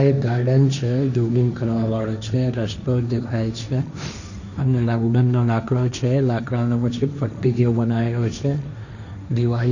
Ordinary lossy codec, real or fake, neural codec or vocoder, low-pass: none; fake; codec, 16 kHz, 1.1 kbps, Voila-Tokenizer; 7.2 kHz